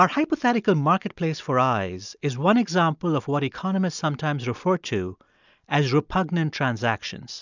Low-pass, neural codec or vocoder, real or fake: 7.2 kHz; none; real